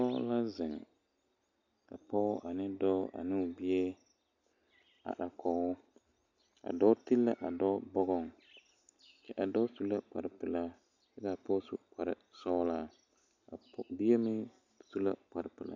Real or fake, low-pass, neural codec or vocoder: real; 7.2 kHz; none